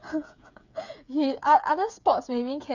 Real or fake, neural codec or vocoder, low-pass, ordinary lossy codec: fake; codec, 16 kHz, 8 kbps, FreqCodec, smaller model; 7.2 kHz; none